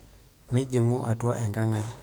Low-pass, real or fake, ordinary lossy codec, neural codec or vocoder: none; fake; none; codec, 44.1 kHz, 2.6 kbps, SNAC